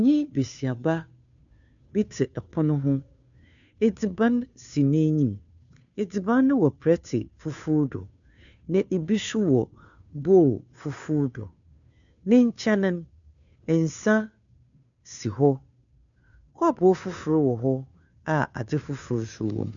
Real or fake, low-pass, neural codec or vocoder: fake; 7.2 kHz; codec, 16 kHz, 2 kbps, FunCodec, trained on Chinese and English, 25 frames a second